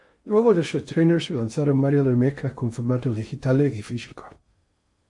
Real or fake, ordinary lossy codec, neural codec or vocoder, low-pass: fake; MP3, 48 kbps; codec, 16 kHz in and 24 kHz out, 0.6 kbps, FocalCodec, streaming, 4096 codes; 10.8 kHz